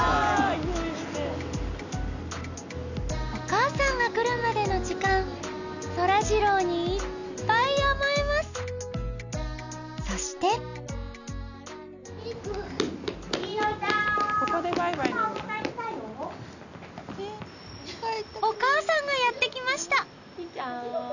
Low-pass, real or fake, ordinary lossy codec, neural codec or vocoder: 7.2 kHz; real; none; none